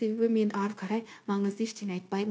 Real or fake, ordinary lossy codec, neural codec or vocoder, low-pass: fake; none; codec, 16 kHz, 0.9 kbps, LongCat-Audio-Codec; none